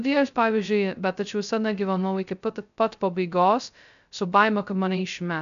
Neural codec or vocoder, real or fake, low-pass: codec, 16 kHz, 0.2 kbps, FocalCodec; fake; 7.2 kHz